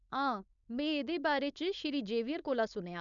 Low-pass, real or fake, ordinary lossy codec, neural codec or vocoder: 7.2 kHz; fake; none; codec, 16 kHz, 4.8 kbps, FACodec